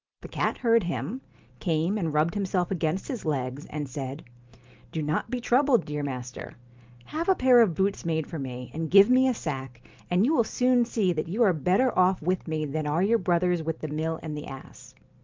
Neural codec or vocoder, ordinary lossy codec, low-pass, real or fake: none; Opus, 24 kbps; 7.2 kHz; real